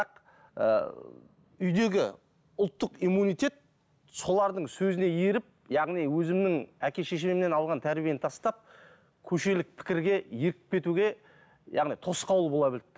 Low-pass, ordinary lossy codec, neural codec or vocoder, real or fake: none; none; none; real